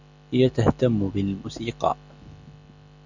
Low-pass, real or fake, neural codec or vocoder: 7.2 kHz; real; none